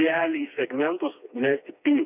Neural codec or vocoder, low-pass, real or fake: codec, 16 kHz, 2 kbps, FreqCodec, smaller model; 3.6 kHz; fake